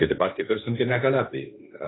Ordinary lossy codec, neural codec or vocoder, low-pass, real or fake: AAC, 16 kbps; codec, 16 kHz, 1.1 kbps, Voila-Tokenizer; 7.2 kHz; fake